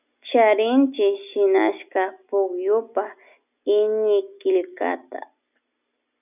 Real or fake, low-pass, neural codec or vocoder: real; 3.6 kHz; none